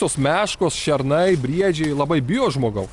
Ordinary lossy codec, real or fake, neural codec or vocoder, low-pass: Opus, 64 kbps; real; none; 10.8 kHz